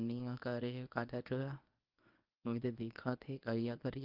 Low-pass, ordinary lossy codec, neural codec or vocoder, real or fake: 5.4 kHz; Opus, 32 kbps; codec, 24 kHz, 0.9 kbps, WavTokenizer, medium speech release version 1; fake